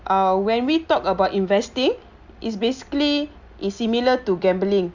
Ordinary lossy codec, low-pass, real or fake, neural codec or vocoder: Opus, 64 kbps; 7.2 kHz; real; none